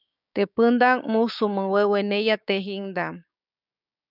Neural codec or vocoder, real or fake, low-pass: codec, 16 kHz, 6 kbps, DAC; fake; 5.4 kHz